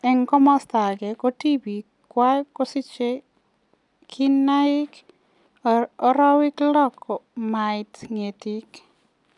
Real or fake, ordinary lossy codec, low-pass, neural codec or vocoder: real; none; 10.8 kHz; none